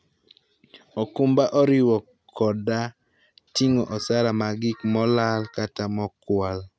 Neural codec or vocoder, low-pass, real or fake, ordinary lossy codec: none; none; real; none